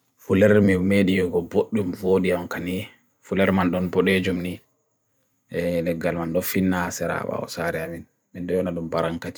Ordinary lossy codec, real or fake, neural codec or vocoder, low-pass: none; fake; vocoder, 44.1 kHz, 128 mel bands every 512 samples, BigVGAN v2; none